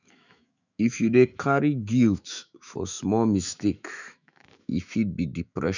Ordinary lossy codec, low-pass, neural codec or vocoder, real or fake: none; 7.2 kHz; autoencoder, 48 kHz, 128 numbers a frame, DAC-VAE, trained on Japanese speech; fake